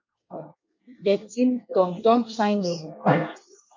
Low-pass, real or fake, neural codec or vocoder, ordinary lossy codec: 7.2 kHz; fake; codec, 24 kHz, 1 kbps, SNAC; MP3, 48 kbps